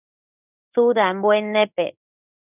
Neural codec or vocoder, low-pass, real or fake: codec, 16 kHz in and 24 kHz out, 1 kbps, XY-Tokenizer; 3.6 kHz; fake